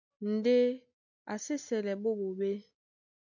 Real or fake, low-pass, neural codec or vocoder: real; 7.2 kHz; none